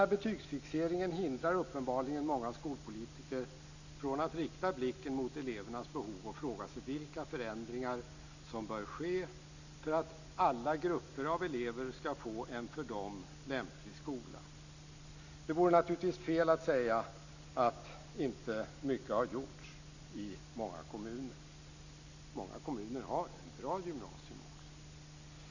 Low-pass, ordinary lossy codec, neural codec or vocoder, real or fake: 7.2 kHz; none; none; real